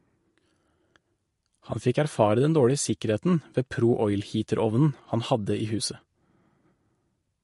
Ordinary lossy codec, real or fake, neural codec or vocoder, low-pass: MP3, 48 kbps; fake; vocoder, 44.1 kHz, 128 mel bands every 512 samples, BigVGAN v2; 14.4 kHz